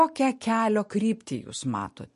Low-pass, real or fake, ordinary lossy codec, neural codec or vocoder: 14.4 kHz; real; MP3, 48 kbps; none